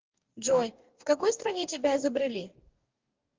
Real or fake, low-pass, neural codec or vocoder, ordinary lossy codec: fake; 7.2 kHz; codec, 44.1 kHz, 2.6 kbps, DAC; Opus, 32 kbps